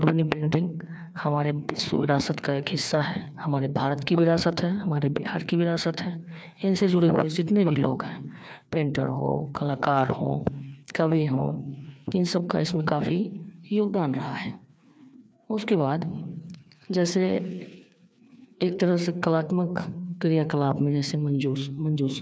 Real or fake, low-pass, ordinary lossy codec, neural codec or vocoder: fake; none; none; codec, 16 kHz, 2 kbps, FreqCodec, larger model